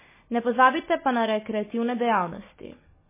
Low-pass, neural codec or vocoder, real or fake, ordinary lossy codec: 3.6 kHz; none; real; MP3, 16 kbps